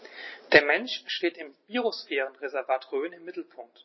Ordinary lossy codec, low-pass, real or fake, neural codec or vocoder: MP3, 24 kbps; 7.2 kHz; real; none